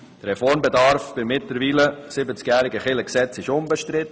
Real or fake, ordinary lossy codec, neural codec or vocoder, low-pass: real; none; none; none